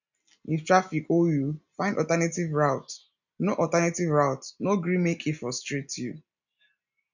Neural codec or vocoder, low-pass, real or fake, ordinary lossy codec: none; 7.2 kHz; real; none